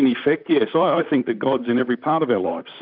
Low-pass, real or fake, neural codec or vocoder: 5.4 kHz; fake; vocoder, 44.1 kHz, 128 mel bands, Pupu-Vocoder